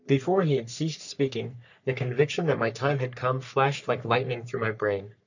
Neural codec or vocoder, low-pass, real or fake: codec, 44.1 kHz, 3.4 kbps, Pupu-Codec; 7.2 kHz; fake